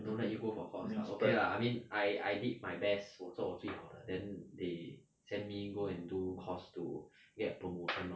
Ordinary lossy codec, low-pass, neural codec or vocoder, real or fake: none; none; none; real